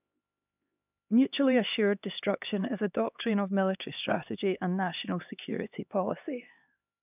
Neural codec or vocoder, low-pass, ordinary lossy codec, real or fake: codec, 16 kHz, 2 kbps, X-Codec, HuBERT features, trained on LibriSpeech; 3.6 kHz; none; fake